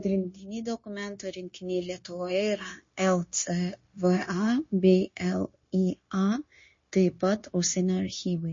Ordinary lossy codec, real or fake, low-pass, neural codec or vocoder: MP3, 32 kbps; fake; 7.2 kHz; codec, 16 kHz, 0.9 kbps, LongCat-Audio-Codec